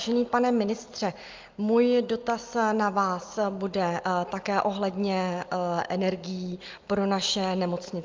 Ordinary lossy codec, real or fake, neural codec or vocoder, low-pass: Opus, 24 kbps; real; none; 7.2 kHz